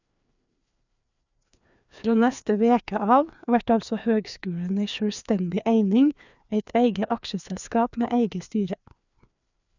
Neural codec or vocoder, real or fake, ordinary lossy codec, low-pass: codec, 16 kHz, 2 kbps, FreqCodec, larger model; fake; none; 7.2 kHz